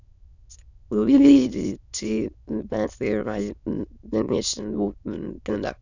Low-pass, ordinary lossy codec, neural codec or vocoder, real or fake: 7.2 kHz; none; autoencoder, 22.05 kHz, a latent of 192 numbers a frame, VITS, trained on many speakers; fake